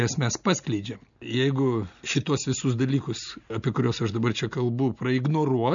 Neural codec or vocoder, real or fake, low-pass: none; real; 7.2 kHz